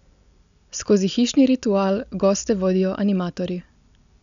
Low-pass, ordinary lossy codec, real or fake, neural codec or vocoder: 7.2 kHz; MP3, 96 kbps; real; none